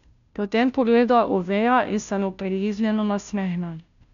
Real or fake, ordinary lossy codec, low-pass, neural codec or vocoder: fake; none; 7.2 kHz; codec, 16 kHz, 0.5 kbps, FunCodec, trained on Chinese and English, 25 frames a second